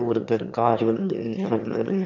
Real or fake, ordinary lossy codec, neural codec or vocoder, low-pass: fake; none; autoencoder, 22.05 kHz, a latent of 192 numbers a frame, VITS, trained on one speaker; 7.2 kHz